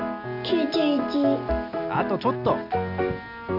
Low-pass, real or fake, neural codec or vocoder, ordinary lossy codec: 5.4 kHz; real; none; none